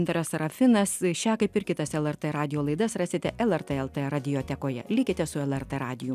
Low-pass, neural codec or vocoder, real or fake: 14.4 kHz; none; real